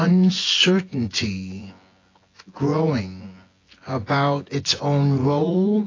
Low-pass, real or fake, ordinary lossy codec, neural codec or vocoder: 7.2 kHz; fake; AAC, 32 kbps; vocoder, 24 kHz, 100 mel bands, Vocos